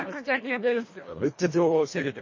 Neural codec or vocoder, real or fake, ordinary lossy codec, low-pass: codec, 24 kHz, 1.5 kbps, HILCodec; fake; MP3, 32 kbps; 7.2 kHz